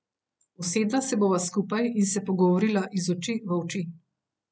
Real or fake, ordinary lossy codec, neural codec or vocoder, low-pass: real; none; none; none